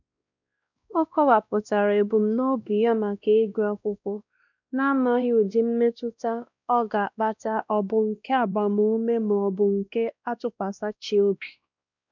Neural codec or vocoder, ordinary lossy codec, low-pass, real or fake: codec, 16 kHz, 1 kbps, X-Codec, WavLM features, trained on Multilingual LibriSpeech; none; 7.2 kHz; fake